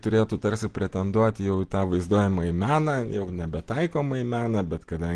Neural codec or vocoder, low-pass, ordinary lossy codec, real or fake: none; 9.9 kHz; Opus, 16 kbps; real